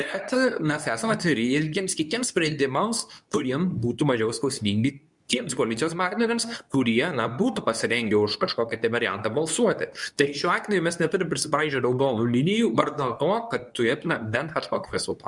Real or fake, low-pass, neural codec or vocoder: fake; 10.8 kHz; codec, 24 kHz, 0.9 kbps, WavTokenizer, medium speech release version 2